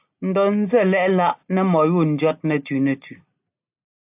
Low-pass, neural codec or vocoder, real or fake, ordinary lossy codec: 3.6 kHz; none; real; AAC, 32 kbps